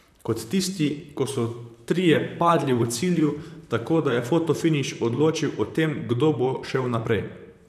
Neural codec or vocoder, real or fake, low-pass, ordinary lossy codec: vocoder, 44.1 kHz, 128 mel bands, Pupu-Vocoder; fake; 14.4 kHz; none